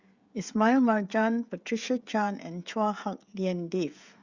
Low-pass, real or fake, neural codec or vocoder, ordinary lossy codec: 7.2 kHz; fake; codec, 16 kHz, 16 kbps, FreqCodec, smaller model; Opus, 64 kbps